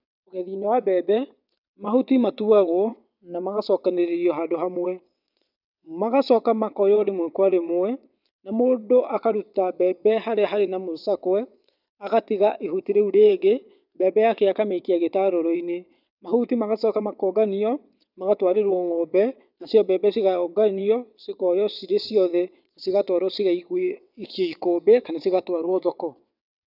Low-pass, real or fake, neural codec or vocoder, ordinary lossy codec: 5.4 kHz; fake; vocoder, 22.05 kHz, 80 mel bands, WaveNeXt; none